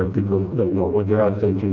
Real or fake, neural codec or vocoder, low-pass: fake; codec, 16 kHz, 1 kbps, FreqCodec, smaller model; 7.2 kHz